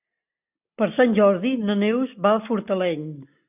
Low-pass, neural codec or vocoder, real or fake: 3.6 kHz; none; real